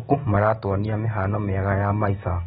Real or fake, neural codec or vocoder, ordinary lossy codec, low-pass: real; none; AAC, 16 kbps; 19.8 kHz